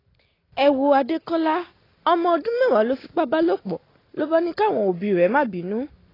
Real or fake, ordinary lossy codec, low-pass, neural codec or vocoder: real; AAC, 24 kbps; 5.4 kHz; none